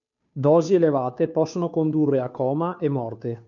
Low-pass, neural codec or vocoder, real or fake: 7.2 kHz; codec, 16 kHz, 2 kbps, FunCodec, trained on Chinese and English, 25 frames a second; fake